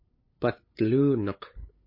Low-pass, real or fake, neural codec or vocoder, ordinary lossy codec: 5.4 kHz; fake; codec, 16 kHz, 8 kbps, FunCodec, trained on LibriTTS, 25 frames a second; MP3, 24 kbps